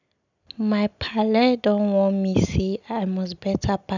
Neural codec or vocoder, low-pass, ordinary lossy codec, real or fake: none; 7.2 kHz; none; real